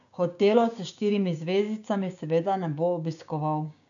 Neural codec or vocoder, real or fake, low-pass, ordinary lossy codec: codec, 16 kHz, 6 kbps, DAC; fake; 7.2 kHz; none